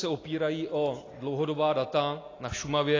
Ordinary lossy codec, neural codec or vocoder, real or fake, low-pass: AAC, 32 kbps; none; real; 7.2 kHz